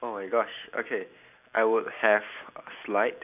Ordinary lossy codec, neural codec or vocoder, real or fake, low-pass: none; none; real; 3.6 kHz